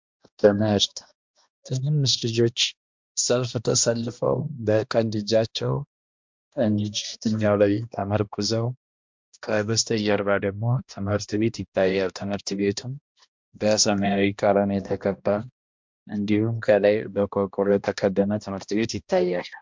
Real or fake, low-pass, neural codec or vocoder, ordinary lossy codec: fake; 7.2 kHz; codec, 16 kHz, 1 kbps, X-Codec, HuBERT features, trained on balanced general audio; MP3, 64 kbps